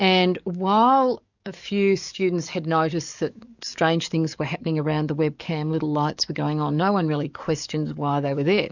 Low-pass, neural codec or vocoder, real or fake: 7.2 kHz; codec, 44.1 kHz, 7.8 kbps, DAC; fake